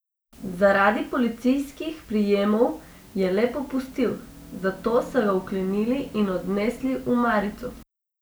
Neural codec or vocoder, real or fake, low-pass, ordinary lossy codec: none; real; none; none